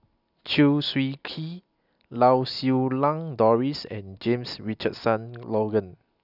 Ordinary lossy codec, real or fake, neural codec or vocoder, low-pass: none; real; none; 5.4 kHz